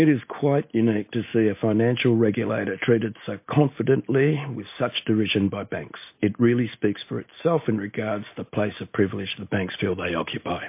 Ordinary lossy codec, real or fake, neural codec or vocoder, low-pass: MP3, 24 kbps; real; none; 3.6 kHz